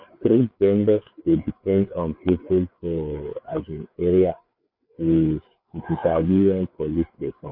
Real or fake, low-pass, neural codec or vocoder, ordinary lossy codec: fake; 5.4 kHz; codec, 16 kHz, 4 kbps, FreqCodec, larger model; none